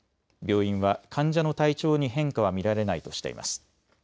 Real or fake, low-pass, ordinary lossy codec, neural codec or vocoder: real; none; none; none